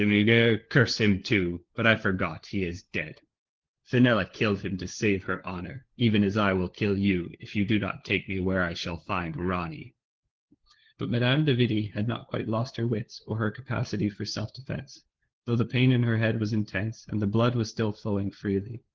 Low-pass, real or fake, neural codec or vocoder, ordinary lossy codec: 7.2 kHz; fake; codec, 16 kHz, 4 kbps, FunCodec, trained on LibriTTS, 50 frames a second; Opus, 16 kbps